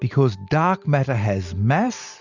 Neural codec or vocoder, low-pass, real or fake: none; 7.2 kHz; real